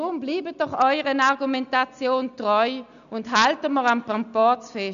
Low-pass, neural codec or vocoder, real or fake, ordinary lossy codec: 7.2 kHz; none; real; none